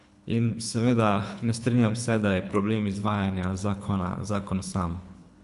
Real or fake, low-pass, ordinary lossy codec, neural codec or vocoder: fake; 10.8 kHz; AAC, 96 kbps; codec, 24 kHz, 3 kbps, HILCodec